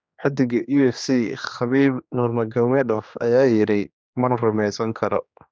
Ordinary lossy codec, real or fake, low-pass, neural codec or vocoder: none; fake; none; codec, 16 kHz, 2 kbps, X-Codec, HuBERT features, trained on general audio